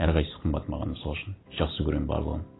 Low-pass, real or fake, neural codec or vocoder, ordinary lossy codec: 7.2 kHz; real; none; AAC, 16 kbps